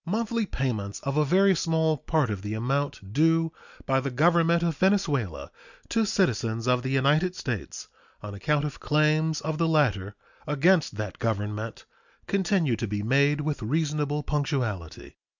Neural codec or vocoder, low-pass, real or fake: none; 7.2 kHz; real